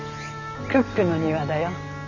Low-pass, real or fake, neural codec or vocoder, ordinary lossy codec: 7.2 kHz; real; none; none